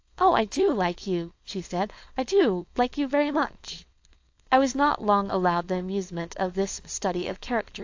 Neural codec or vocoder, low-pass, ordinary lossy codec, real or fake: codec, 16 kHz, 4.8 kbps, FACodec; 7.2 kHz; AAC, 48 kbps; fake